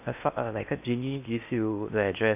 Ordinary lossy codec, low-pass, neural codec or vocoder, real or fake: none; 3.6 kHz; codec, 16 kHz in and 24 kHz out, 0.8 kbps, FocalCodec, streaming, 65536 codes; fake